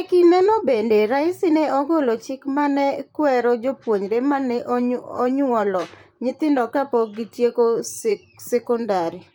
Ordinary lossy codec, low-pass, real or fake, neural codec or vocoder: MP3, 96 kbps; 19.8 kHz; fake; vocoder, 44.1 kHz, 128 mel bands, Pupu-Vocoder